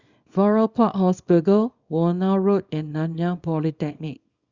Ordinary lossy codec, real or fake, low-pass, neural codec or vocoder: Opus, 64 kbps; fake; 7.2 kHz; codec, 24 kHz, 0.9 kbps, WavTokenizer, small release